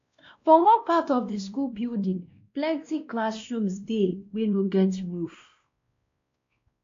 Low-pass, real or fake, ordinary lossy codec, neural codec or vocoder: 7.2 kHz; fake; AAC, 48 kbps; codec, 16 kHz, 1 kbps, X-Codec, WavLM features, trained on Multilingual LibriSpeech